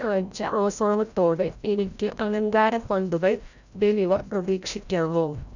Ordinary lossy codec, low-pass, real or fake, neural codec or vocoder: none; 7.2 kHz; fake; codec, 16 kHz, 0.5 kbps, FreqCodec, larger model